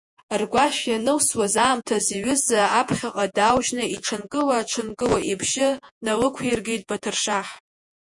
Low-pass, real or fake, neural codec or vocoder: 10.8 kHz; fake; vocoder, 48 kHz, 128 mel bands, Vocos